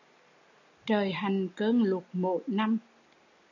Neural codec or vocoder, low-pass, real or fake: none; 7.2 kHz; real